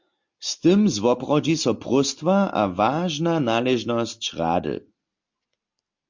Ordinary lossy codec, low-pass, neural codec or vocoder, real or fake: MP3, 48 kbps; 7.2 kHz; none; real